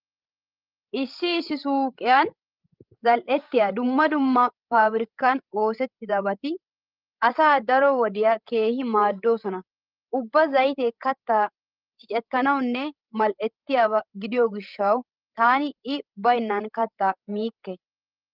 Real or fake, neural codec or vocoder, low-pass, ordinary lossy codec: fake; codec, 16 kHz, 16 kbps, FreqCodec, larger model; 5.4 kHz; Opus, 24 kbps